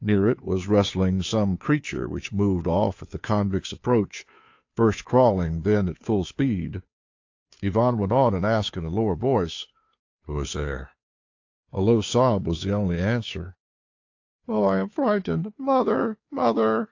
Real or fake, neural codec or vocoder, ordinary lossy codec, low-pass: fake; codec, 16 kHz, 2 kbps, FunCodec, trained on Chinese and English, 25 frames a second; AAC, 48 kbps; 7.2 kHz